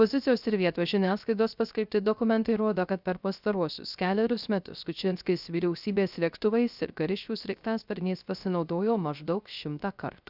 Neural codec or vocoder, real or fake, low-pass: codec, 16 kHz, 0.3 kbps, FocalCodec; fake; 5.4 kHz